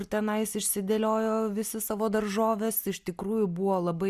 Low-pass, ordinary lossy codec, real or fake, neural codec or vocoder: 14.4 kHz; Opus, 64 kbps; real; none